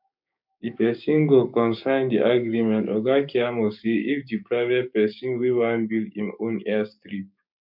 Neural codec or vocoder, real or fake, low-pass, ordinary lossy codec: codec, 16 kHz, 6 kbps, DAC; fake; 5.4 kHz; none